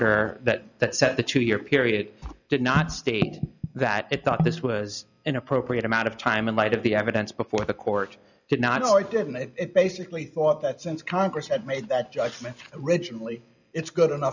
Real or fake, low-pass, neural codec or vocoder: real; 7.2 kHz; none